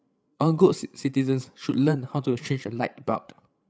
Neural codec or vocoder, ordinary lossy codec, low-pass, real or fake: codec, 16 kHz, 8 kbps, FreqCodec, larger model; none; none; fake